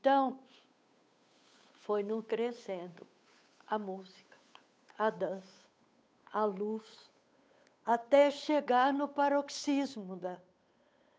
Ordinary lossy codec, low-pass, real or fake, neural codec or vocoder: none; none; fake; codec, 16 kHz, 8 kbps, FunCodec, trained on Chinese and English, 25 frames a second